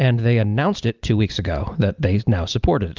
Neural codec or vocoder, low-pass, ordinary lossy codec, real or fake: none; 7.2 kHz; Opus, 24 kbps; real